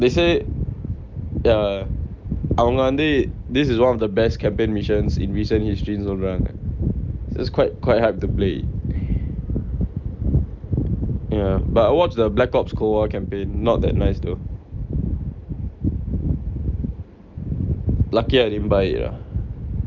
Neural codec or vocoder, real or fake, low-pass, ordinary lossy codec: none; real; 7.2 kHz; Opus, 32 kbps